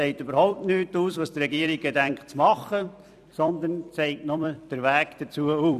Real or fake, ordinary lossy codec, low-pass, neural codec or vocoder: fake; none; 14.4 kHz; vocoder, 44.1 kHz, 128 mel bands every 256 samples, BigVGAN v2